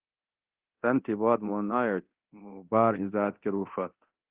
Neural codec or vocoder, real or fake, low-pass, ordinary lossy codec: codec, 24 kHz, 0.9 kbps, DualCodec; fake; 3.6 kHz; Opus, 16 kbps